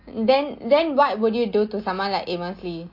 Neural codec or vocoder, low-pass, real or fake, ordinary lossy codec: none; 5.4 kHz; real; MP3, 32 kbps